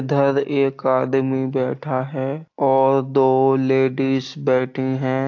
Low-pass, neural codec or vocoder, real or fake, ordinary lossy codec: 7.2 kHz; none; real; AAC, 48 kbps